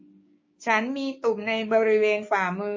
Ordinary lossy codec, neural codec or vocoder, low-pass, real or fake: MP3, 32 kbps; codec, 16 kHz, 6 kbps, DAC; 7.2 kHz; fake